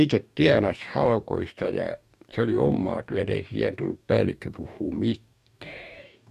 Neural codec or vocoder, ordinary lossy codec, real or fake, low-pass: codec, 44.1 kHz, 2.6 kbps, DAC; none; fake; 14.4 kHz